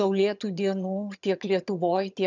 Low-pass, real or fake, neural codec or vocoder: 7.2 kHz; fake; vocoder, 22.05 kHz, 80 mel bands, HiFi-GAN